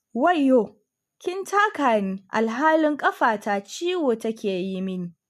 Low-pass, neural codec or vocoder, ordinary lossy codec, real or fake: 14.4 kHz; none; MP3, 64 kbps; real